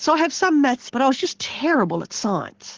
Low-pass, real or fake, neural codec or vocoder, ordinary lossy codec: 7.2 kHz; fake; codec, 44.1 kHz, 7.8 kbps, Pupu-Codec; Opus, 16 kbps